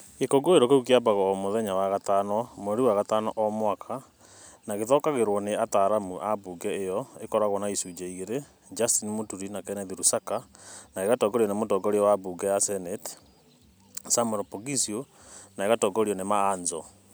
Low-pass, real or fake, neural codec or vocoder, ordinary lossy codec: none; real; none; none